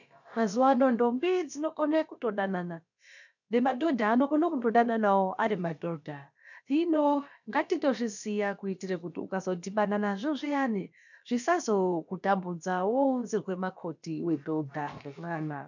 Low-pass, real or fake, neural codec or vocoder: 7.2 kHz; fake; codec, 16 kHz, about 1 kbps, DyCAST, with the encoder's durations